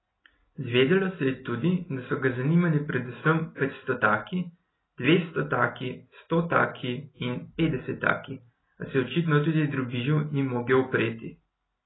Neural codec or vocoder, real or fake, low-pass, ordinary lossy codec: none; real; 7.2 kHz; AAC, 16 kbps